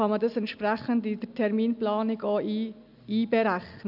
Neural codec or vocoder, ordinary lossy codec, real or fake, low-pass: none; none; real; 5.4 kHz